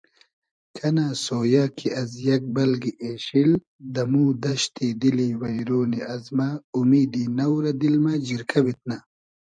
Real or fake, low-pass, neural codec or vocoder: fake; 9.9 kHz; vocoder, 44.1 kHz, 128 mel bands every 512 samples, BigVGAN v2